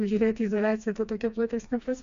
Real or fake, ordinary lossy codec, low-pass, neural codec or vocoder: fake; MP3, 64 kbps; 7.2 kHz; codec, 16 kHz, 2 kbps, FreqCodec, smaller model